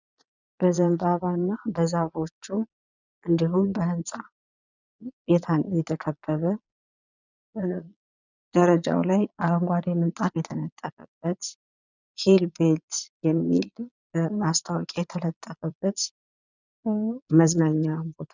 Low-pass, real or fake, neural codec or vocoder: 7.2 kHz; fake; vocoder, 22.05 kHz, 80 mel bands, Vocos